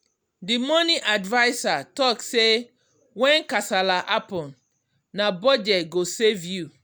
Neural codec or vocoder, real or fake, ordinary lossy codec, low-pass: none; real; none; none